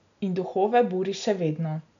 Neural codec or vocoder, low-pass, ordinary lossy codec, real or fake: none; 7.2 kHz; none; real